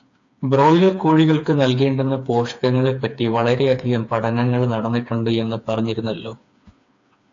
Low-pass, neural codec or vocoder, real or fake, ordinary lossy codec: 7.2 kHz; codec, 16 kHz, 4 kbps, FreqCodec, smaller model; fake; AAC, 48 kbps